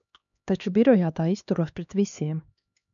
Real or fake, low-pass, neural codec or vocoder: fake; 7.2 kHz; codec, 16 kHz, 2 kbps, X-Codec, HuBERT features, trained on LibriSpeech